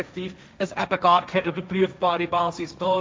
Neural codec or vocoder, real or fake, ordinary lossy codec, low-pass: codec, 16 kHz, 1.1 kbps, Voila-Tokenizer; fake; none; none